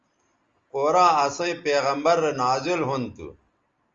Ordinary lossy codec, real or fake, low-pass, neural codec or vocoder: Opus, 32 kbps; real; 7.2 kHz; none